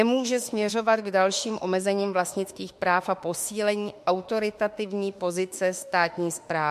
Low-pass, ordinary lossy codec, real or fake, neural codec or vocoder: 14.4 kHz; MP3, 64 kbps; fake; autoencoder, 48 kHz, 32 numbers a frame, DAC-VAE, trained on Japanese speech